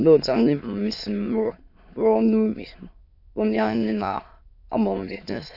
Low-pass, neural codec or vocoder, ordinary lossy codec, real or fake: 5.4 kHz; autoencoder, 22.05 kHz, a latent of 192 numbers a frame, VITS, trained on many speakers; AAC, 48 kbps; fake